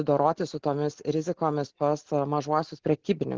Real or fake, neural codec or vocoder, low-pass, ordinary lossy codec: real; none; 7.2 kHz; Opus, 16 kbps